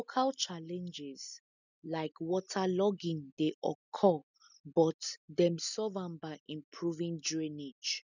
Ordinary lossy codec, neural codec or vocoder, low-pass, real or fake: none; none; 7.2 kHz; real